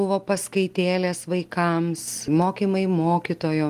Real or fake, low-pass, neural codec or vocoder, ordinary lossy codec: real; 14.4 kHz; none; Opus, 24 kbps